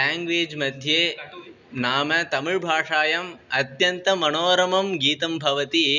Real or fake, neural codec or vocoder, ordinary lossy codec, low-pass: real; none; none; 7.2 kHz